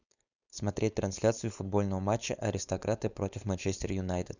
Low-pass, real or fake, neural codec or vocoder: 7.2 kHz; fake; codec, 16 kHz, 4.8 kbps, FACodec